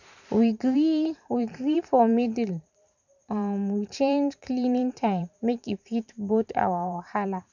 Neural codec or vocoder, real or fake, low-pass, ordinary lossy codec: vocoder, 44.1 kHz, 128 mel bands every 512 samples, BigVGAN v2; fake; 7.2 kHz; none